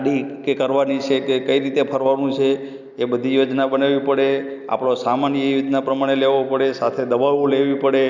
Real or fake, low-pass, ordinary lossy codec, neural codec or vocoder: real; 7.2 kHz; none; none